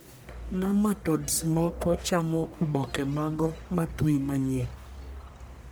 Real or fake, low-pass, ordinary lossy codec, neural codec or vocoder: fake; none; none; codec, 44.1 kHz, 1.7 kbps, Pupu-Codec